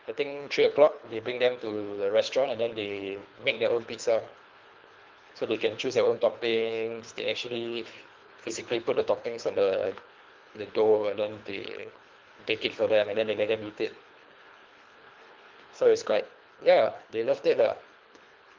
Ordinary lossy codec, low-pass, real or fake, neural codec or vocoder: Opus, 24 kbps; 7.2 kHz; fake; codec, 24 kHz, 3 kbps, HILCodec